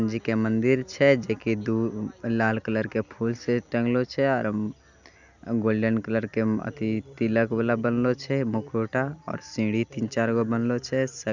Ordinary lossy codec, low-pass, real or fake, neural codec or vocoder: none; 7.2 kHz; real; none